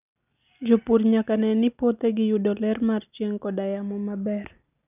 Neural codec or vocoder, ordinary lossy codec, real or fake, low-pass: none; none; real; 3.6 kHz